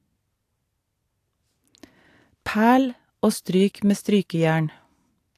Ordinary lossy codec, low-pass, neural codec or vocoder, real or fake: AAC, 64 kbps; 14.4 kHz; none; real